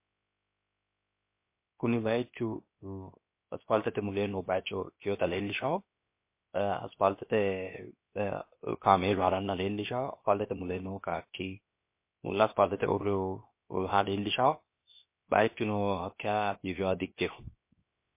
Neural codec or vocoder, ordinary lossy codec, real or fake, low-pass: codec, 16 kHz, 0.7 kbps, FocalCodec; MP3, 24 kbps; fake; 3.6 kHz